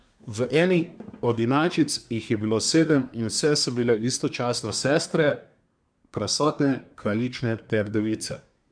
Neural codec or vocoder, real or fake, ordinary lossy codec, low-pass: codec, 24 kHz, 1 kbps, SNAC; fake; none; 9.9 kHz